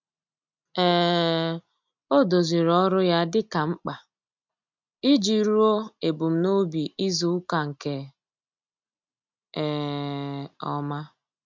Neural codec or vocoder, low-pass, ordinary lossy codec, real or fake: none; 7.2 kHz; MP3, 64 kbps; real